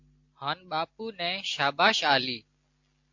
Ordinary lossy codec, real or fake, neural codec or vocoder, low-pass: AAC, 48 kbps; real; none; 7.2 kHz